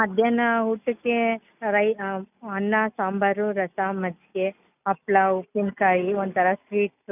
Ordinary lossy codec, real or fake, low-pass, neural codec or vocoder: none; real; 3.6 kHz; none